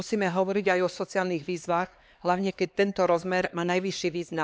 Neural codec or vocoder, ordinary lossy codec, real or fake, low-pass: codec, 16 kHz, 2 kbps, X-Codec, HuBERT features, trained on LibriSpeech; none; fake; none